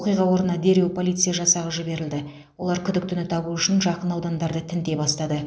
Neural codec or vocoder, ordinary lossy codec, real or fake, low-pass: none; none; real; none